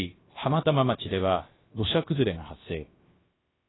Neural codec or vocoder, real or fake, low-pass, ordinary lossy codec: codec, 16 kHz, about 1 kbps, DyCAST, with the encoder's durations; fake; 7.2 kHz; AAC, 16 kbps